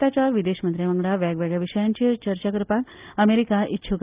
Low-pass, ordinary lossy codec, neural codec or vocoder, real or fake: 3.6 kHz; Opus, 24 kbps; none; real